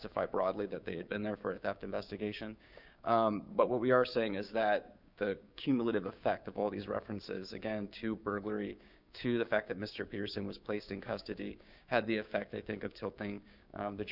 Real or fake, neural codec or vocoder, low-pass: fake; codec, 16 kHz, 6 kbps, DAC; 5.4 kHz